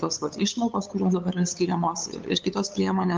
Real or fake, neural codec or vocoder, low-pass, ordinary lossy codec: fake; codec, 16 kHz, 8 kbps, FunCodec, trained on LibriTTS, 25 frames a second; 7.2 kHz; Opus, 24 kbps